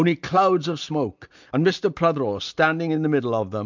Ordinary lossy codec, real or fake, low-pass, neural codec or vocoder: MP3, 64 kbps; real; 7.2 kHz; none